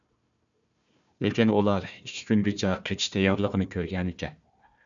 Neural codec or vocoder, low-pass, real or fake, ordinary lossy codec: codec, 16 kHz, 1 kbps, FunCodec, trained on Chinese and English, 50 frames a second; 7.2 kHz; fake; MP3, 96 kbps